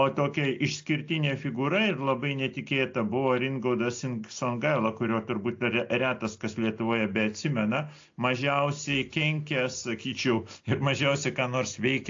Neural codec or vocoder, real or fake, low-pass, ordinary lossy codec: none; real; 7.2 kHz; AAC, 48 kbps